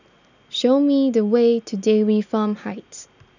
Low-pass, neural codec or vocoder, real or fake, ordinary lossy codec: 7.2 kHz; none; real; none